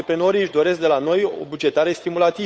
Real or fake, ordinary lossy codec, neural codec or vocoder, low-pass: fake; none; codec, 16 kHz, 8 kbps, FunCodec, trained on Chinese and English, 25 frames a second; none